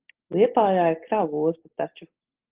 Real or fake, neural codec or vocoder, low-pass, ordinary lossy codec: real; none; 3.6 kHz; Opus, 16 kbps